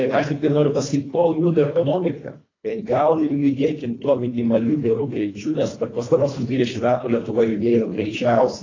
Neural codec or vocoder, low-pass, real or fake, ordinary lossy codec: codec, 24 kHz, 1.5 kbps, HILCodec; 7.2 kHz; fake; AAC, 32 kbps